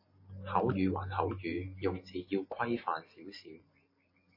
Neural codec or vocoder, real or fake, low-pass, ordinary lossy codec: none; real; 5.4 kHz; MP3, 24 kbps